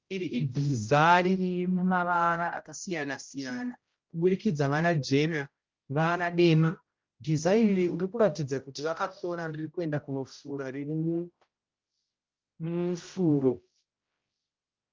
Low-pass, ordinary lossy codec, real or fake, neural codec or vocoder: 7.2 kHz; Opus, 24 kbps; fake; codec, 16 kHz, 0.5 kbps, X-Codec, HuBERT features, trained on general audio